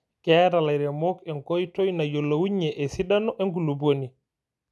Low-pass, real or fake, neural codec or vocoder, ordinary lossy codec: none; real; none; none